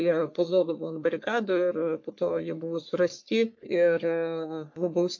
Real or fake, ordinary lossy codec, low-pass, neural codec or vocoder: fake; MP3, 48 kbps; 7.2 kHz; codec, 44.1 kHz, 3.4 kbps, Pupu-Codec